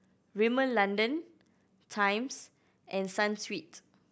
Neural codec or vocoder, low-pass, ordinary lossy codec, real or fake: none; none; none; real